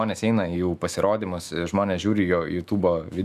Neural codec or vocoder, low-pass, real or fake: autoencoder, 48 kHz, 128 numbers a frame, DAC-VAE, trained on Japanese speech; 14.4 kHz; fake